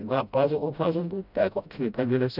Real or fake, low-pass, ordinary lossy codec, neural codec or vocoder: fake; 5.4 kHz; none; codec, 16 kHz, 0.5 kbps, FreqCodec, smaller model